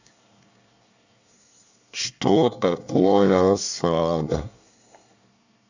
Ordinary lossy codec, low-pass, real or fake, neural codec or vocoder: none; 7.2 kHz; fake; codec, 24 kHz, 1 kbps, SNAC